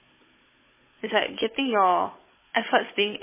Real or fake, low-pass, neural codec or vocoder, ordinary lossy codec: fake; 3.6 kHz; codec, 16 kHz, 16 kbps, FunCodec, trained on Chinese and English, 50 frames a second; MP3, 16 kbps